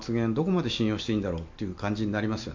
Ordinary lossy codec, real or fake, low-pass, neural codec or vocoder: MP3, 64 kbps; real; 7.2 kHz; none